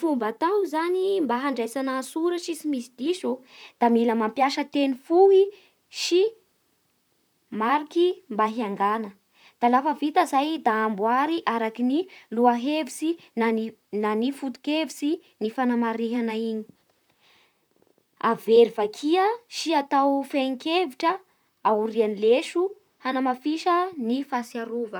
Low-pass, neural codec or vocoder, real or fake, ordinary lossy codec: none; vocoder, 44.1 kHz, 128 mel bands, Pupu-Vocoder; fake; none